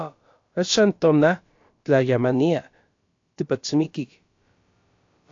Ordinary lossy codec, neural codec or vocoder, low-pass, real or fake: AAC, 48 kbps; codec, 16 kHz, about 1 kbps, DyCAST, with the encoder's durations; 7.2 kHz; fake